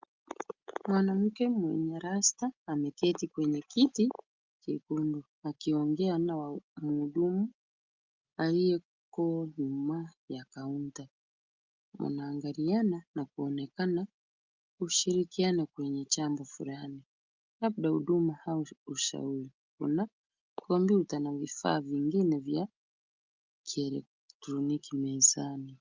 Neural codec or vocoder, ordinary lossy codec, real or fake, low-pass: none; Opus, 32 kbps; real; 7.2 kHz